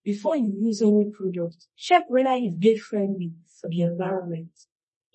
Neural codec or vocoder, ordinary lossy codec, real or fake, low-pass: codec, 24 kHz, 0.9 kbps, WavTokenizer, medium music audio release; MP3, 32 kbps; fake; 10.8 kHz